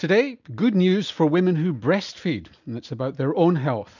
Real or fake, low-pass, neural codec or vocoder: real; 7.2 kHz; none